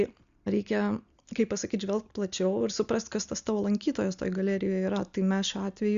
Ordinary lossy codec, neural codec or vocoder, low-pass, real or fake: Opus, 64 kbps; none; 7.2 kHz; real